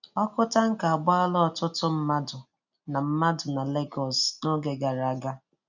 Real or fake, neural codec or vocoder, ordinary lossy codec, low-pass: real; none; none; 7.2 kHz